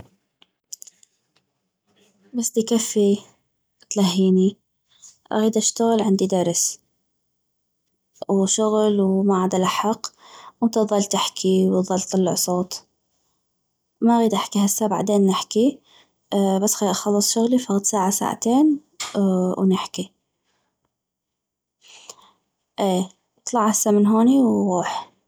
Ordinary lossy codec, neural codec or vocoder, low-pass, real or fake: none; none; none; real